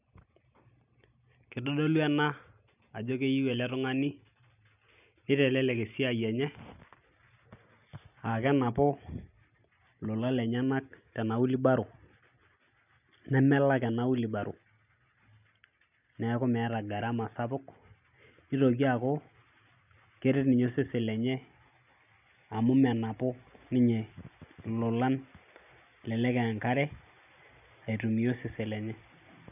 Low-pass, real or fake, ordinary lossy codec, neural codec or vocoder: 3.6 kHz; real; none; none